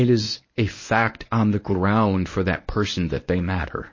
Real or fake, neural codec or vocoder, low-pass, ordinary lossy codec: fake; codec, 24 kHz, 0.9 kbps, WavTokenizer, medium speech release version 1; 7.2 kHz; MP3, 32 kbps